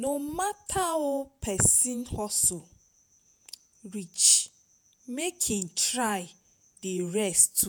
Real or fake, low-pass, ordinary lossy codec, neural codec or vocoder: fake; none; none; vocoder, 48 kHz, 128 mel bands, Vocos